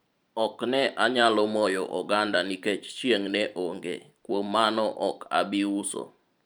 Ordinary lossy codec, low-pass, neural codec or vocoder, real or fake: none; none; vocoder, 44.1 kHz, 128 mel bands every 512 samples, BigVGAN v2; fake